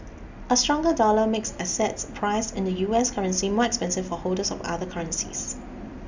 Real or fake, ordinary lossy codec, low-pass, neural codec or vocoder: real; Opus, 64 kbps; 7.2 kHz; none